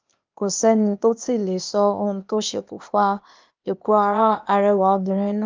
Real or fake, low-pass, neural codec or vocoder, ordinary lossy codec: fake; 7.2 kHz; codec, 16 kHz, 0.8 kbps, ZipCodec; Opus, 24 kbps